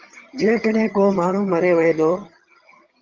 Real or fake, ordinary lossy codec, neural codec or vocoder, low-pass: fake; Opus, 32 kbps; vocoder, 22.05 kHz, 80 mel bands, HiFi-GAN; 7.2 kHz